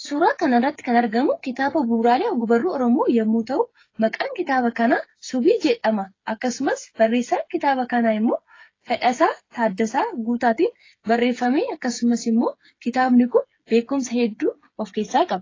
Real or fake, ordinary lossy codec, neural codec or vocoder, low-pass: fake; AAC, 32 kbps; codec, 16 kHz, 8 kbps, FreqCodec, smaller model; 7.2 kHz